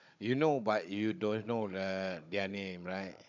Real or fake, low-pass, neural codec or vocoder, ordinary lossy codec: fake; 7.2 kHz; codec, 16 kHz, 16 kbps, FunCodec, trained on Chinese and English, 50 frames a second; MP3, 64 kbps